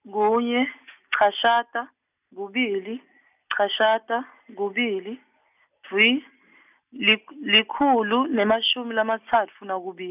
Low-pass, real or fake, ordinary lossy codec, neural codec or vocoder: 3.6 kHz; real; none; none